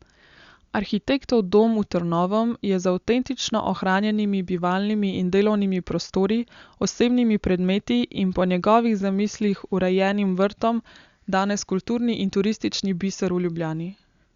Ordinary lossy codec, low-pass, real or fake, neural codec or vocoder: Opus, 64 kbps; 7.2 kHz; real; none